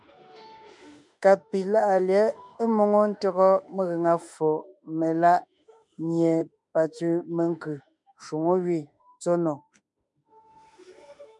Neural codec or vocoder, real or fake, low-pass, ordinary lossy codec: autoencoder, 48 kHz, 32 numbers a frame, DAC-VAE, trained on Japanese speech; fake; 10.8 kHz; MP3, 96 kbps